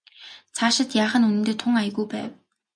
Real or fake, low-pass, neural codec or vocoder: real; 9.9 kHz; none